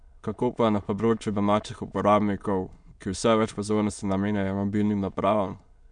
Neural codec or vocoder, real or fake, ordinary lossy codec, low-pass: autoencoder, 22.05 kHz, a latent of 192 numbers a frame, VITS, trained on many speakers; fake; none; 9.9 kHz